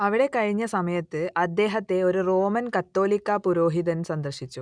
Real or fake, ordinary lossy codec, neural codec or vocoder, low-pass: real; none; none; 9.9 kHz